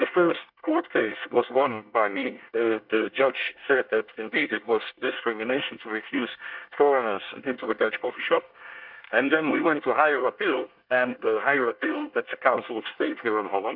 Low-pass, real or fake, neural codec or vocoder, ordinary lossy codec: 5.4 kHz; fake; codec, 24 kHz, 1 kbps, SNAC; Opus, 64 kbps